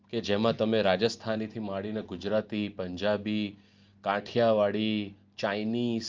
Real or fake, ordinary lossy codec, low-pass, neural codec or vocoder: real; Opus, 24 kbps; 7.2 kHz; none